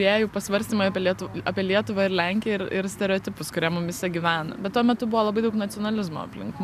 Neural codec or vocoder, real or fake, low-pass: none; real; 14.4 kHz